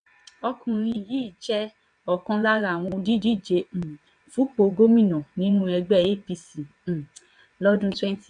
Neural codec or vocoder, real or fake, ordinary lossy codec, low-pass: vocoder, 22.05 kHz, 80 mel bands, WaveNeXt; fake; none; 9.9 kHz